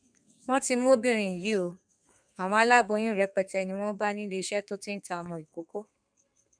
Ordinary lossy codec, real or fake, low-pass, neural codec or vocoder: none; fake; 9.9 kHz; codec, 32 kHz, 1.9 kbps, SNAC